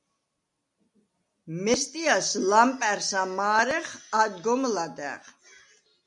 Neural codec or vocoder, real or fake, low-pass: none; real; 10.8 kHz